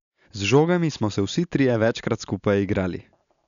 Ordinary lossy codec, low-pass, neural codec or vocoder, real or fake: none; 7.2 kHz; none; real